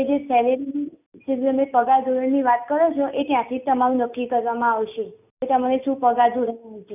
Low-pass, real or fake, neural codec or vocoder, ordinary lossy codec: 3.6 kHz; real; none; none